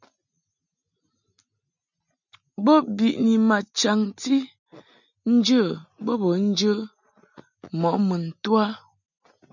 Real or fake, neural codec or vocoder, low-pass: real; none; 7.2 kHz